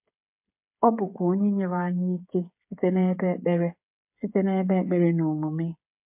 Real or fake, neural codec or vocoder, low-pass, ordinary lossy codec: fake; codec, 16 kHz, 16 kbps, FreqCodec, smaller model; 3.6 kHz; MP3, 32 kbps